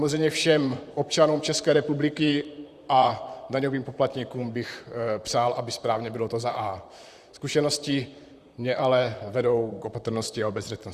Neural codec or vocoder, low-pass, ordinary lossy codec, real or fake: vocoder, 44.1 kHz, 128 mel bands, Pupu-Vocoder; 14.4 kHz; Opus, 64 kbps; fake